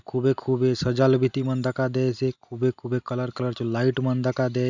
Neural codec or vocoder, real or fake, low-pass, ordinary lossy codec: none; real; 7.2 kHz; none